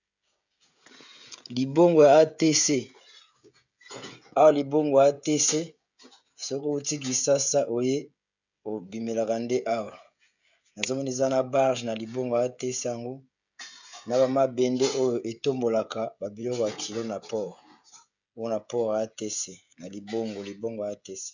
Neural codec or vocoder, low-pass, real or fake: codec, 16 kHz, 16 kbps, FreqCodec, smaller model; 7.2 kHz; fake